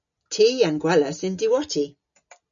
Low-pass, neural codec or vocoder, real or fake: 7.2 kHz; none; real